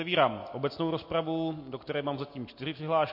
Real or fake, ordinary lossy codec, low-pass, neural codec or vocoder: real; MP3, 32 kbps; 5.4 kHz; none